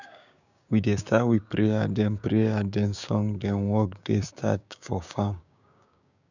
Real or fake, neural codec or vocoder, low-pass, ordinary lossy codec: fake; codec, 16 kHz, 6 kbps, DAC; 7.2 kHz; none